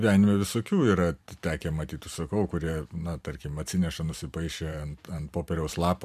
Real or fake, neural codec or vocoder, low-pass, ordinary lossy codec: fake; vocoder, 44.1 kHz, 128 mel bands every 512 samples, BigVGAN v2; 14.4 kHz; MP3, 96 kbps